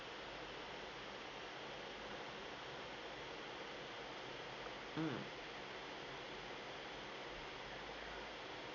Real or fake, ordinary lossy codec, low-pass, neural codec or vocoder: real; none; 7.2 kHz; none